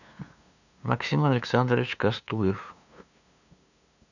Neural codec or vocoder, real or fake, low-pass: codec, 16 kHz, 2 kbps, FunCodec, trained on LibriTTS, 25 frames a second; fake; 7.2 kHz